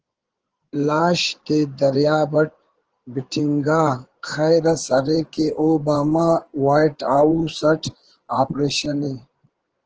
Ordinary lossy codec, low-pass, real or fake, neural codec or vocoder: Opus, 16 kbps; 7.2 kHz; fake; codec, 16 kHz in and 24 kHz out, 2.2 kbps, FireRedTTS-2 codec